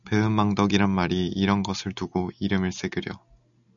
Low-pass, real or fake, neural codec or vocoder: 7.2 kHz; real; none